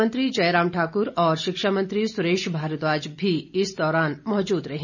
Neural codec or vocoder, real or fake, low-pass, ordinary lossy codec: none; real; 7.2 kHz; none